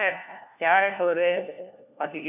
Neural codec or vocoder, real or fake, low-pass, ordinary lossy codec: codec, 16 kHz, 1 kbps, FunCodec, trained on LibriTTS, 50 frames a second; fake; 3.6 kHz; none